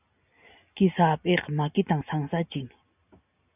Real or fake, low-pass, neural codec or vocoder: real; 3.6 kHz; none